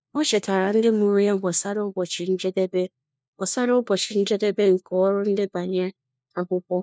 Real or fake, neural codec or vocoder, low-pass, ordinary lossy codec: fake; codec, 16 kHz, 1 kbps, FunCodec, trained on LibriTTS, 50 frames a second; none; none